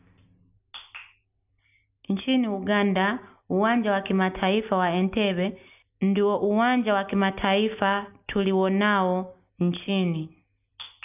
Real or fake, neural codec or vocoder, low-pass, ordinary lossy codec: real; none; 3.6 kHz; none